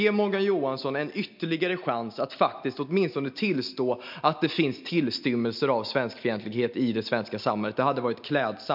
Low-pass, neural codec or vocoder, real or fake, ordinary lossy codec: 5.4 kHz; none; real; MP3, 48 kbps